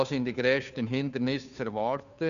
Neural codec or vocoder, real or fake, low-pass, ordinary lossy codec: codec, 16 kHz, 2 kbps, FunCodec, trained on Chinese and English, 25 frames a second; fake; 7.2 kHz; none